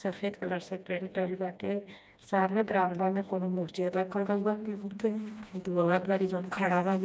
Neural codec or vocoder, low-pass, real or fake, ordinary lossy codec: codec, 16 kHz, 1 kbps, FreqCodec, smaller model; none; fake; none